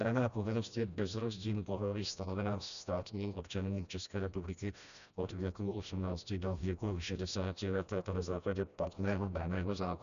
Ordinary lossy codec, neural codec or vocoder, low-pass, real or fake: MP3, 96 kbps; codec, 16 kHz, 1 kbps, FreqCodec, smaller model; 7.2 kHz; fake